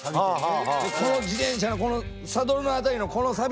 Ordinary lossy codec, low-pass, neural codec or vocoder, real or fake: none; none; none; real